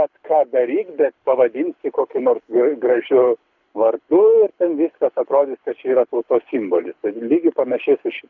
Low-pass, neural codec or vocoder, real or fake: 7.2 kHz; codec, 24 kHz, 6 kbps, HILCodec; fake